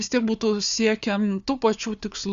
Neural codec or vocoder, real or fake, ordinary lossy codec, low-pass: codec, 16 kHz, 4 kbps, FunCodec, trained on Chinese and English, 50 frames a second; fake; Opus, 64 kbps; 7.2 kHz